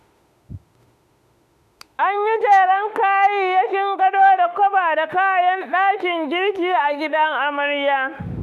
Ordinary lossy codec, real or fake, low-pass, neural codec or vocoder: none; fake; 14.4 kHz; autoencoder, 48 kHz, 32 numbers a frame, DAC-VAE, trained on Japanese speech